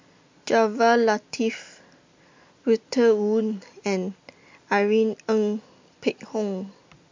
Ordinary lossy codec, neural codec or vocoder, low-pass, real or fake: MP3, 48 kbps; none; 7.2 kHz; real